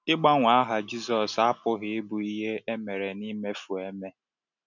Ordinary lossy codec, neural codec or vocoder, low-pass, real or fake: none; none; 7.2 kHz; real